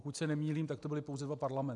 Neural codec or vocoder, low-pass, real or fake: none; 10.8 kHz; real